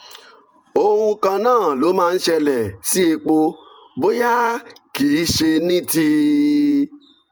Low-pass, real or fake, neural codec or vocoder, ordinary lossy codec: none; fake; vocoder, 48 kHz, 128 mel bands, Vocos; none